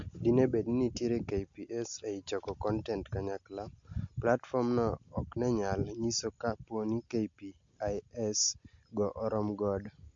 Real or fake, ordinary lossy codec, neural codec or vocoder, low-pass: real; MP3, 48 kbps; none; 7.2 kHz